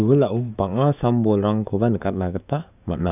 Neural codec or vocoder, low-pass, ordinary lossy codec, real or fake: codec, 16 kHz, 6 kbps, DAC; 3.6 kHz; none; fake